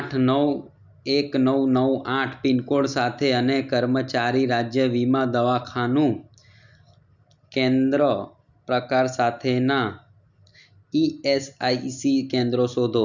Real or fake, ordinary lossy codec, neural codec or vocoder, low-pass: real; none; none; 7.2 kHz